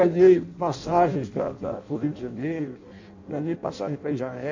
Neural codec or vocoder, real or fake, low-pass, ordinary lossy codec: codec, 16 kHz in and 24 kHz out, 0.6 kbps, FireRedTTS-2 codec; fake; 7.2 kHz; MP3, 48 kbps